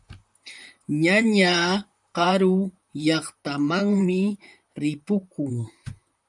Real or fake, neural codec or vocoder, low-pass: fake; vocoder, 44.1 kHz, 128 mel bands, Pupu-Vocoder; 10.8 kHz